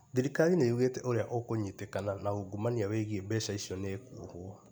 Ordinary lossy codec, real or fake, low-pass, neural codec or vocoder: none; real; none; none